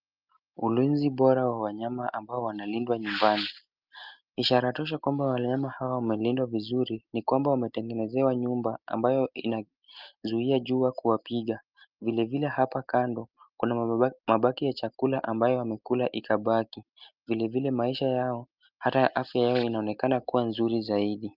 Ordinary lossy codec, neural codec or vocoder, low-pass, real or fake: Opus, 24 kbps; none; 5.4 kHz; real